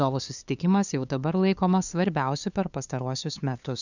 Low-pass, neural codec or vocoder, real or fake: 7.2 kHz; codec, 16 kHz, 2 kbps, FunCodec, trained on LibriTTS, 25 frames a second; fake